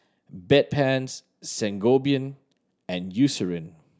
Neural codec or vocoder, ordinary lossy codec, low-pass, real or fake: none; none; none; real